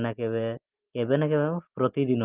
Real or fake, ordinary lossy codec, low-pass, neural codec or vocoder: real; Opus, 32 kbps; 3.6 kHz; none